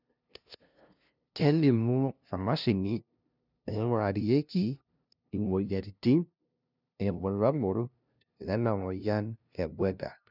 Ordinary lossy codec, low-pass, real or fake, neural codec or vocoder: none; 5.4 kHz; fake; codec, 16 kHz, 0.5 kbps, FunCodec, trained on LibriTTS, 25 frames a second